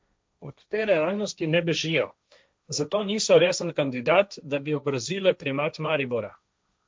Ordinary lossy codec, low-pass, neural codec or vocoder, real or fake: none; none; codec, 16 kHz, 1.1 kbps, Voila-Tokenizer; fake